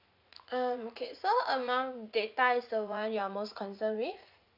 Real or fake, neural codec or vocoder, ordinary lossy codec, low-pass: fake; vocoder, 44.1 kHz, 80 mel bands, Vocos; none; 5.4 kHz